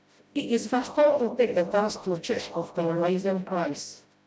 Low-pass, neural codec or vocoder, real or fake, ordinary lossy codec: none; codec, 16 kHz, 0.5 kbps, FreqCodec, smaller model; fake; none